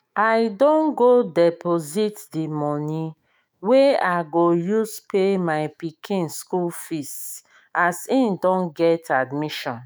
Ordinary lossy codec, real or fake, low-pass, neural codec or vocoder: none; fake; none; autoencoder, 48 kHz, 128 numbers a frame, DAC-VAE, trained on Japanese speech